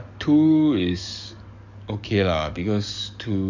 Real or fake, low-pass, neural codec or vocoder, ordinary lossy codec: fake; 7.2 kHz; codec, 44.1 kHz, 7.8 kbps, DAC; none